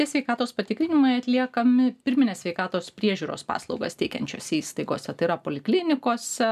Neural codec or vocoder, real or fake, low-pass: none; real; 14.4 kHz